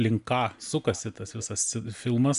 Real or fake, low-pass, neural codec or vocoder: real; 10.8 kHz; none